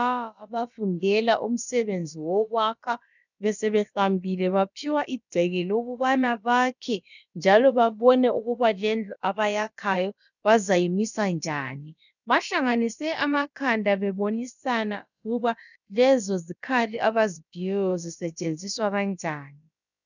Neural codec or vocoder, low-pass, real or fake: codec, 16 kHz, about 1 kbps, DyCAST, with the encoder's durations; 7.2 kHz; fake